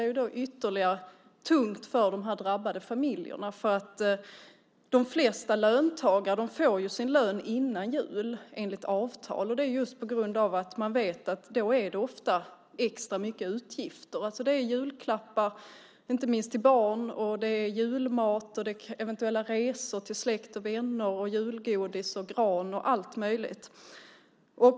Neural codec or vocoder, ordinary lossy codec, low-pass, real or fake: none; none; none; real